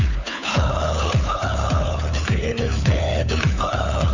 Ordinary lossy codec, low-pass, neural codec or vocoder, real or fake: none; 7.2 kHz; codec, 16 kHz, 4 kbps, FunCodec, trained on LibriTTS, 50 frames a second; fake